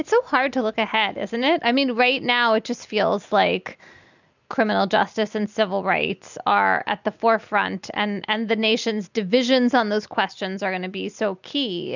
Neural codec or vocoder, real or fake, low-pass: none; real; 7.2 kHz